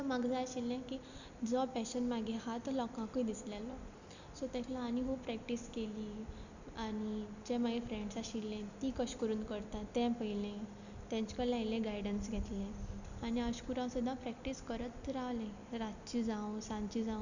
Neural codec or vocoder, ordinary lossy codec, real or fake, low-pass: none; none; real; 7.2 kHz